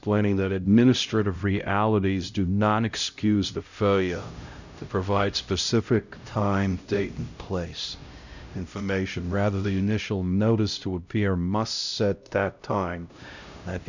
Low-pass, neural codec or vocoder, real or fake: 7.2 kHz; codec, 16 kHz, 0.5 kbps, X-Codec, HuBERT features, trained on LibriSpeech; fake